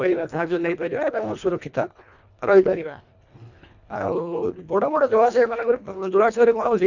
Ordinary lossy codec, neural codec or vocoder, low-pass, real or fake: none; codec, 24 kHz, 1.5 kbps, HILCodec; 7.2 kHz; fake